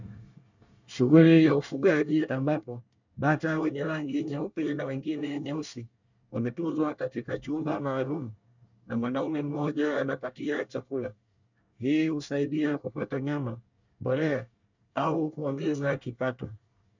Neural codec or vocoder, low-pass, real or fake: codec, 24 kHz, 1 kbps, SNAC; 7.2 kHz; fake